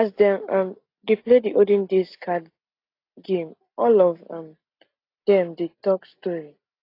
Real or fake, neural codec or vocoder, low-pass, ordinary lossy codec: real; none; 5.4 kHz; AAC, 32 kbps